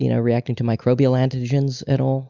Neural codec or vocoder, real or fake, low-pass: none; real; 7.2 kHz